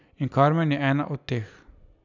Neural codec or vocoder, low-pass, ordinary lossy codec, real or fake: none; 7.2 kHz; none; real